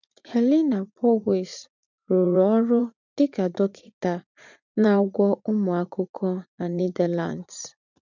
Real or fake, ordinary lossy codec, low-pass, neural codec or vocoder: fake; none; 7.2 kHz; vocoder, 44.1 kHz, 80 mel bands, Vocos